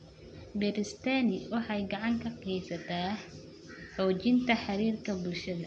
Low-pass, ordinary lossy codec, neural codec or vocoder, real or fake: 9.9 kHz; AAC, 64 kbps; none; real